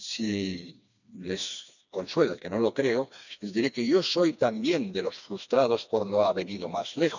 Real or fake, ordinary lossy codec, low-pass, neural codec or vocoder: fake; none; 7.2 kHz; codec, 16 kHz, 2 kbps, FreqCodec, smaller model